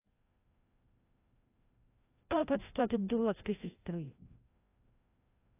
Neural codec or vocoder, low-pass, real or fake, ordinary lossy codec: codec, 16 kHz, 0.5 kbps, FreqCodec, larger model; 3.6 kHz; fake; AAC, 24 kbps